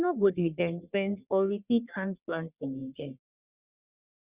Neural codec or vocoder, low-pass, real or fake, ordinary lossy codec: codec, 44.1 kHz, 1.7 kbps, Pupu-Codec; 3.6 kHz; fake; Opus, 64 kbps